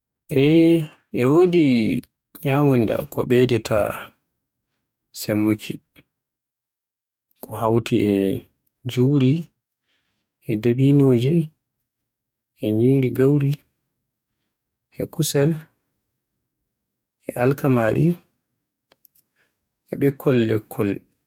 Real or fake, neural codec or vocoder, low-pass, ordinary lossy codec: fake; codec, 44.1 kHz, 2.6 kbps, DAC; 19.8 kHz; none